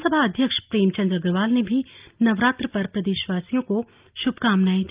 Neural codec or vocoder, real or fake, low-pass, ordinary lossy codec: none; real; 3.6 kHz; Opus, 32 kbps